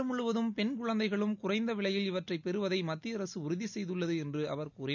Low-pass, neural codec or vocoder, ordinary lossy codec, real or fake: 7.2 kHz; none; none; real